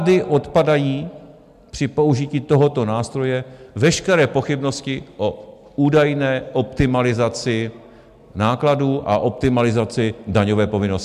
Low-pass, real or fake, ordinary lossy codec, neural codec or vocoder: 14.4 kHz; real; MP3, 96 kbps; none